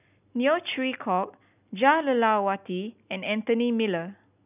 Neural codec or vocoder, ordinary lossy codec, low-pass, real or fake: none; none; 3.6 kHz; real